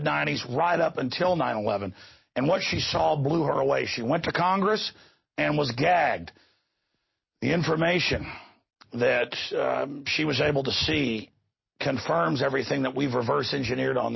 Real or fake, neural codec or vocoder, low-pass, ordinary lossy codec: real; none; 7.2 kHz; MP3, 24 kbps